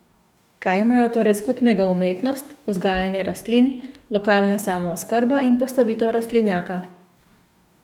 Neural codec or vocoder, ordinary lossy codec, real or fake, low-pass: codec, 44.1 kHz, 2.6 kbps, DAC; none; fake; 19.8 kHz